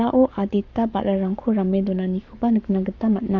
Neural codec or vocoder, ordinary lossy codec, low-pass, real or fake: codec, 44.1 kHz, 7.8 kbps, Pupu-Codec; none; 7.2 kHz; fake